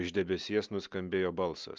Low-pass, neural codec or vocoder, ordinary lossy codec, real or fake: 7.2 kHz; none; Opus, 32 kbps; real